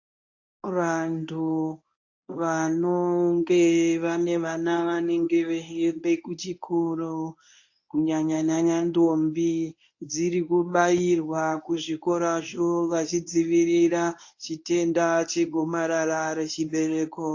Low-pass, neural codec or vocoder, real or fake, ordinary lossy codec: 7.2 kHz; codec, 24 kHz, 0.9 kbps, WavTokenizer, medium speech release version 1; fake; AAC, 48 kbps